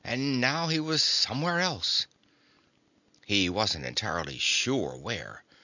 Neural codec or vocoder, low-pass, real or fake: none; 7.2 kHz; real